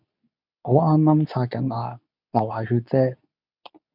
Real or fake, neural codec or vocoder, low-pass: fake; codec, 24 kHz, 0.9 kbps, WavTokenizer, medium speech release version 2; 5.4 kHz